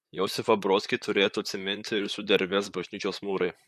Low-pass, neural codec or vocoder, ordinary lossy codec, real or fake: 14.4 kHz; vocoder, 44.1 kHz, 128 mel bands, Pupu-Vocoder; MP3, 64 kbps; fake